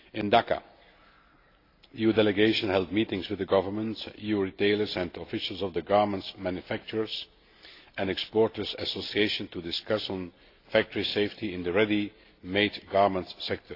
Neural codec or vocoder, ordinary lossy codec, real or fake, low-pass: none; AAC, 32 kbps; real; 5.4 kHz